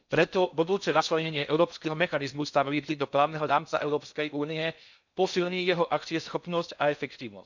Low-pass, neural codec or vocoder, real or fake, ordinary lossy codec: 7.2 kHz; codec, 16 kHz in and 24 kHz out, 0.6 kbps, FocalCodec, streaming, 4096 codes; fake; none